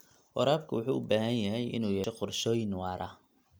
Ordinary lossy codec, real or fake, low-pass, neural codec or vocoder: none; real; none; none